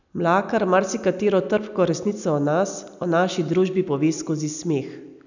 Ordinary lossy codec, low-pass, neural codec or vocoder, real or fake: none; 7.2 kHz; none; real